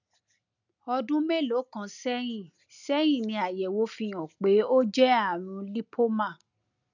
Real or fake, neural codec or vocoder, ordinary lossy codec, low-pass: real; none; none; 7.2 kHz